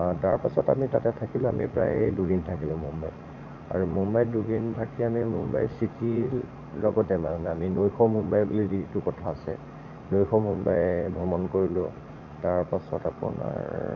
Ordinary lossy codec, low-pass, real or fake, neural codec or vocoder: AAC, 48 kbps; 7.2 kHz; fake; vocoder, 44.1 kHz, 80 mel bands, Vocos